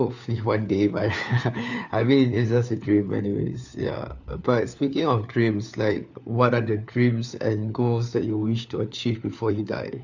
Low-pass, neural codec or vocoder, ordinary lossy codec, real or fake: 7.2 kHz; codec, 16 kHz, 4 kbps, FunCodec, trained on LibriTTS, 50 frames a second; none; fake